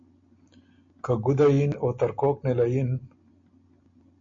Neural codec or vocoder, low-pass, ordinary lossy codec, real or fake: none; 7.2 kHz; MP3, 48 kbps; real